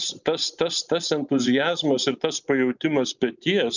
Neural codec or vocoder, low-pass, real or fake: none; 7.2 kHz; real